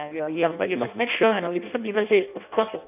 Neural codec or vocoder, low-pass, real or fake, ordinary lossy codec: codec, 16 kHz in and 24 kHz out, 0.6 kbps, FireRedTTS-2 codec; 3.6 kHz; fake; none